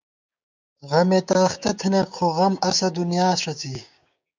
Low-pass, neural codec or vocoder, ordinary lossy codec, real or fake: 7.2 kHz; codec, 16 kHz, 6 kbps, DAC; MP3, 64 kbps; fake